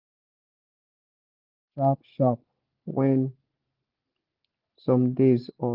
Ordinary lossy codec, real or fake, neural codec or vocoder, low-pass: Opus, 32 kbps; real; none; 5.4 kHz